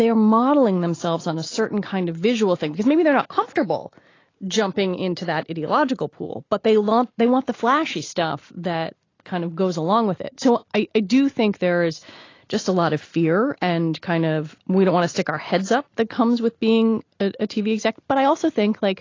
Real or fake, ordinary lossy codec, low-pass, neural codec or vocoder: real; AAC, 32 kbps; 7.2 kHz; none